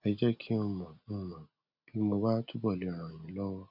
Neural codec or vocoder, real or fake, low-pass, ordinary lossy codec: none; real; 5.4 kHz; none